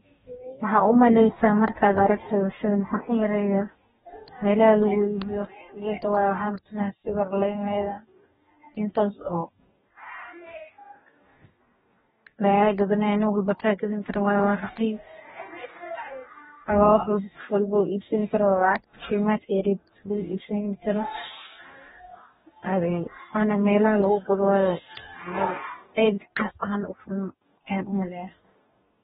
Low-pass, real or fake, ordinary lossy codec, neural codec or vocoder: 19.8 kHz; fake; AAC, 16 kbps; codec, 44.1 kHz, 2.6 kbps, DAC